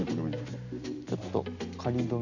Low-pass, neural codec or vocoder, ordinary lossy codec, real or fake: 7.2 kHz; none; none; real